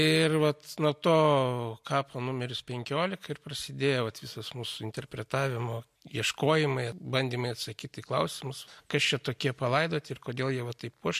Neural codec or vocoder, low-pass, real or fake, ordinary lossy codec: none; 14.4 kHz; real; MP3, 64 kbps